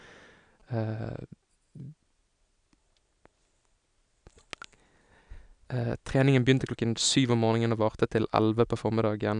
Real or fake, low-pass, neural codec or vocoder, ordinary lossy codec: real; 9.9 kHz; none; none